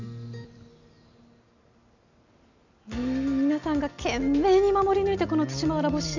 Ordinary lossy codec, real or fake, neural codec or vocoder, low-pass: none; real; none; 7.2 kHz